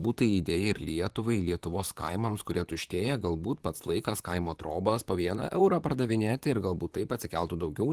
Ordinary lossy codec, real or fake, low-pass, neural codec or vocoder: Opus, 24 kbps; fake; 14.4 kHz; vocoder, 44.1 kHz, 128 mel bands, Pupu-Vocoder